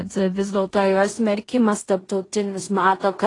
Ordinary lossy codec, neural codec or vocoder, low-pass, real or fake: AAC, 32 kbps; codec, 16 kHz in and 24 kHz out, 0.4 kbps, LongCat-Audio-Codec, two codebook decoder; 10.8 kHz; fake